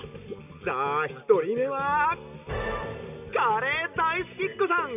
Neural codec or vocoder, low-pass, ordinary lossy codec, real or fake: none; 3.6 kHz; none; real